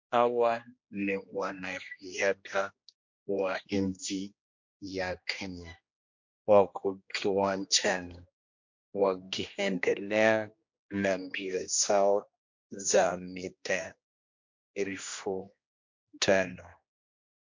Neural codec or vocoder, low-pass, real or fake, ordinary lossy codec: codec, 16 kHz, 1 kbps, X-Codec, HuBERT features, trained on general audio; 7.2 kHz; fake; MP3, 48 kbps